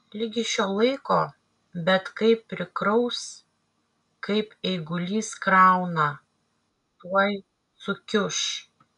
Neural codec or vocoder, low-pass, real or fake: none; 10.8 kHz; real